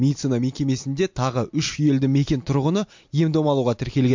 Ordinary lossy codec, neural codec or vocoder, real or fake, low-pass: MP3, 48 kbps; none; real; 7.2 kHz